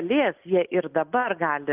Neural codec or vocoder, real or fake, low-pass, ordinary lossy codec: none; real; 3.6 kHz; Opus, 24 kbps